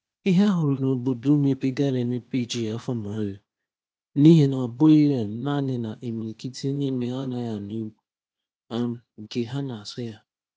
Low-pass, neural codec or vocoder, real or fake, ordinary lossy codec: none; codec, 16 kHz, 0.8 kbps, ZipCodec; fake; none